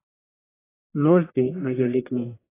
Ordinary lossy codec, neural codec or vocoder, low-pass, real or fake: AAC, 16 kbps; codec, 44.1 kHz, 1.7 kbps, Pupu-Codec; 3.6 kHz; fake